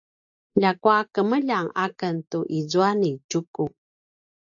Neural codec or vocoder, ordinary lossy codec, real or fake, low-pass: none; AAC, 64 kbps; real; 7.2 kHz